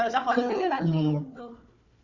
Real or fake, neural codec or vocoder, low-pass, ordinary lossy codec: fake; codec, 16 kHz, 4 kbps, FunCodec, trained on Chinese and English, 50 frames a second; 7.2 kHz; Opus, 64 kbps